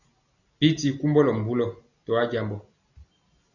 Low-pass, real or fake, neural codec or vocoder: 7.2 kHz; real; none